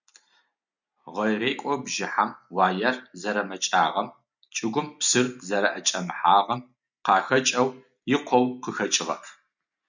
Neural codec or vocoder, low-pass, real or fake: none; 7.2 kHz; real